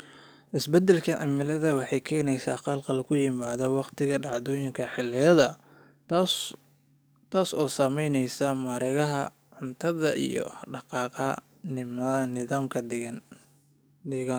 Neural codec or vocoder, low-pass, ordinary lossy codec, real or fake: codec, 44.1 kHz, 7.8 kbps, DAC; none; none; fake